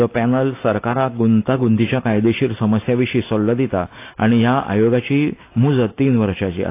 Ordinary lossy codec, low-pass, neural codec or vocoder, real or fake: AAC, 24 kbps; 3.6 kHz; none; real